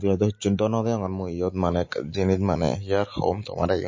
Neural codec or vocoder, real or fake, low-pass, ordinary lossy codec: none; real; 7.2 kHz; MP3, 32 kbps